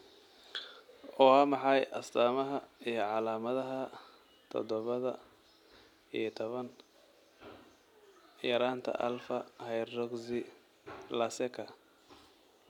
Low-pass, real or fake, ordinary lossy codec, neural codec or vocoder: 19.8 kHz; real; none; none